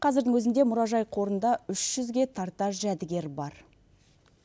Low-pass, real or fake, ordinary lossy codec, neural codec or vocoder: none; real; none; none